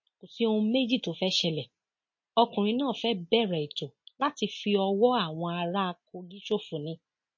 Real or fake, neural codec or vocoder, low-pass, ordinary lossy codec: real; none; 7.2 kHz; MP3, 32 kbps